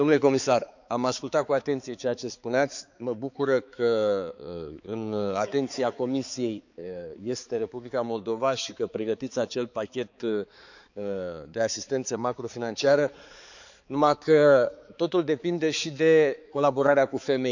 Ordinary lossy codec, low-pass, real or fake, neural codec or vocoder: none; 7.2 kHz; fake; codec, 16 kHz, 4 kbps, X-Codec, HuBERT features, trained on balanced general audio